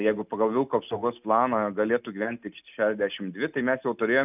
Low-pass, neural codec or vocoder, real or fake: 3.6 kHz; none; real